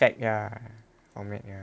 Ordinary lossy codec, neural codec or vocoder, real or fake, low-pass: none; none; real; none